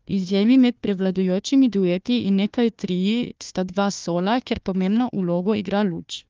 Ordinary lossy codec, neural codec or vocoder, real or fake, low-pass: Opus, 32 kbps; codec, 16 kHz, 1 kbps, FunCodec, trained on Chinese and English, 50 frames a second; fake; 7.2 kHz